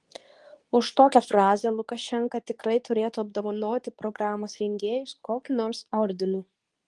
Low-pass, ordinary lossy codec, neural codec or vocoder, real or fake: 10.8 kHz; Opus, 24 kbps; codec, 24 kHz, 0.9 kbps, WavTokenizer, medium speech release version 2; fake